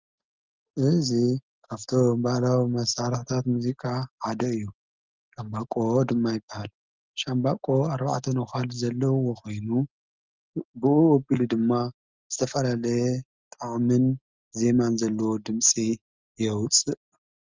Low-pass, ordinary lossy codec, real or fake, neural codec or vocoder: 7.2 kHz; Opus, 32 kbps; real; none